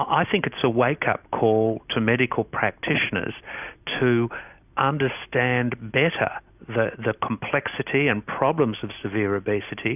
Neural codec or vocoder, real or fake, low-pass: none; real; 3.6 kHz